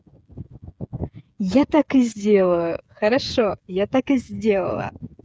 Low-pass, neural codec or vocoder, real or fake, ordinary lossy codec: none; codec, 16 kHz, 8 kbps, FreqCodec, smaller model; fake; none